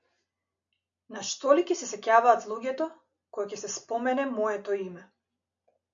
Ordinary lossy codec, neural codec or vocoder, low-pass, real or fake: MP3, 64 kbps; none; 7.2 kHz; real